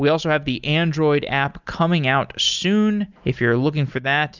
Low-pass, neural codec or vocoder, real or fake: 7.2 kHz; none; real